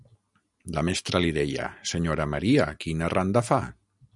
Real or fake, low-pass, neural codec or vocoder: real; 10.8 kHz; none